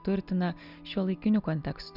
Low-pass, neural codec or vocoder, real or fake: 5.4 kHz; none; real